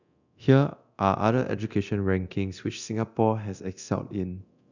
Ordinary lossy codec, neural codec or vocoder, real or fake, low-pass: none; codec, 24 kHz, 0.9 kbps, DualCodec; fake; 7.2 kHz